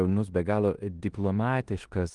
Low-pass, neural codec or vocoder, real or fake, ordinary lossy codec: 10.8 kHz; codec, 16 kHz in and 24 kHz out, 0.9 kbps, LongCat-Audio-Codec, four codebook decoder; fake; Opus, 24 kbps